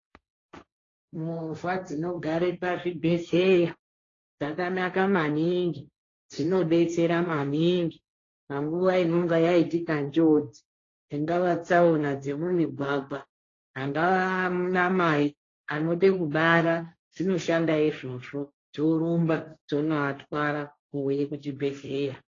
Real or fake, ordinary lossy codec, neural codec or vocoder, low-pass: fake; AAC, 32 kbps; codec, 16 kHz, 1.1 kbps, Voila-Tokenizer; 7.2 kHz